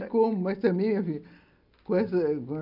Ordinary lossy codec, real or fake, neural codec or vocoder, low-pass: none; real; none; 5.4 kHz